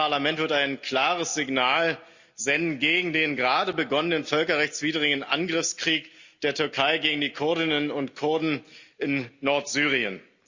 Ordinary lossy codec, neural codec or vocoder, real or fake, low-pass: Opus, 64 kbps; none; real; 7.2 kHz